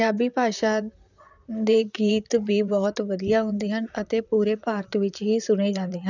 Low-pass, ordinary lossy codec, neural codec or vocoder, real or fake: 7.2 kHz; none; vocoder, 44.1 kHz, 128 mel bands, Pupu-Vocoder; fake